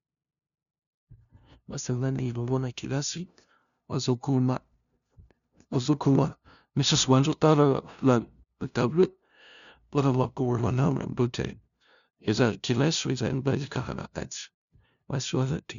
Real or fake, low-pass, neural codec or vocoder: fake; 7.2 kHz; codec, 16 kHz, 0.5 kbps, FunCodec, trained on LibriTTS, 25 frames a second